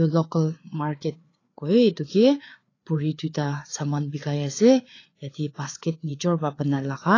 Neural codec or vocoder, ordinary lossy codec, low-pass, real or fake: codec, 16 kHz, 4 kbps, FreqCodec, larger model; AAC, 32 kbps; 7.2 kHz; fake